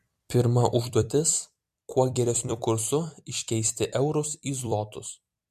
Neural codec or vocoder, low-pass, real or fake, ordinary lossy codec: vocoder, 44.1 kHz, 128 mel bands every 256 samples, BigVGAN v2; 14.4 kHz; fake; MP3, 64 kbps